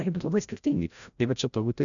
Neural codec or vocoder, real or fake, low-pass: codec, 16 kHz, 0.5 kbps, FreqCodec, larger model; fake; 7.2 kHz